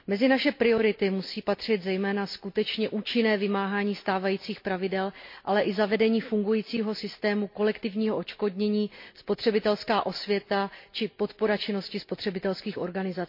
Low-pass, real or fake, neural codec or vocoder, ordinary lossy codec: 5.4 kHz; real; none; MP3, 32 kbps